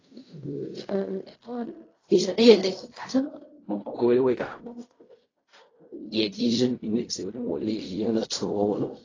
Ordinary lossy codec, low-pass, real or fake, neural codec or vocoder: AAC, 32 kbps; 7.2 kHz; fake; codec, 16 kHz in and 24 kHz out, 0.4 kbps, LongCat-Audio-Codec, fine tuned four codebook decoder